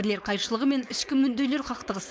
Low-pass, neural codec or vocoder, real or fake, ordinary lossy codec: none; codec, 16 kHz, 4.8 kbps, FACodec; fake; none